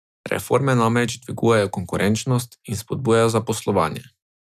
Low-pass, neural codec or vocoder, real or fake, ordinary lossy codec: 14.4 kHz; none; real; none